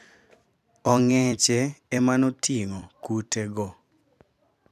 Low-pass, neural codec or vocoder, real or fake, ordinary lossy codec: 14.4 kHz; vocoder, 44.1 kHz, 128 mel bands every 256 samples, BigVGAN v2; fake; none